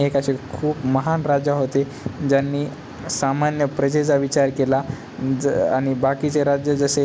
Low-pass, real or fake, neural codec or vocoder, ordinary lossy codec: none; real; none; none